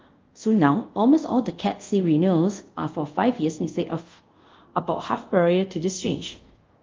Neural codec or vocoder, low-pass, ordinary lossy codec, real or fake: codec, 24 kHz, 0.5 kbps, DualCodec; 7.2 kHz; Opus, 32 kbps; fake